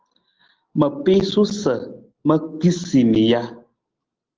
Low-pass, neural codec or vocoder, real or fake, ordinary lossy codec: 7.2 kHz; none; real; Opus, 16 kbps